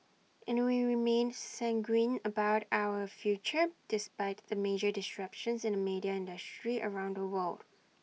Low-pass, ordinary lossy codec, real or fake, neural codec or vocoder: none; none; real; none